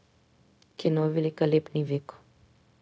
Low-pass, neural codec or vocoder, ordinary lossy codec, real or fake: none; codec, 16 kHz, 0.4 kbps, LongCat-Audio-Codec; none; fake